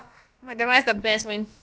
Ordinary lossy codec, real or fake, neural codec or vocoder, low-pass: none; fake; codec, 16 kHz, about 1 kbps, DyCAST, with the encoder's durations; none